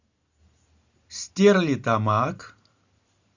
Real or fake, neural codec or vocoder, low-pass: real; none; 7.2 kHz